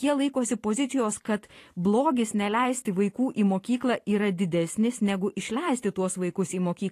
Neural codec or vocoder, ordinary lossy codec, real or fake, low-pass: vocoder, 44.1 kHz, 128 mel bands every 512 samples, BigVGAN v2; AAC, 48 kbps; fake; 14.4 kHz